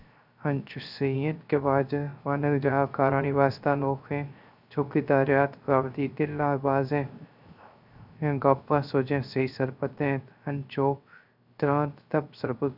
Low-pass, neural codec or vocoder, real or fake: 5.4 kHz; codec, 16 kHz, 0.3 kbps, FocalCodec; fake